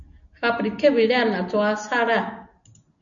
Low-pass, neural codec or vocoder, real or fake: 7.2 kHz; none; real